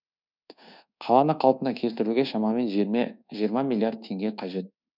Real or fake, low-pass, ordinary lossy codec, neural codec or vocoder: fake; 5.4 kHz; none; codec, 24 kHz, 1.2 kbps, DualCodec